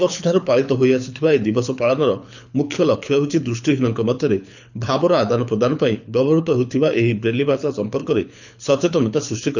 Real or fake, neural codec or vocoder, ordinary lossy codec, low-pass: fake; codec, 24 kHz, 6 kbps, HILCodec; none; 7.2 kHz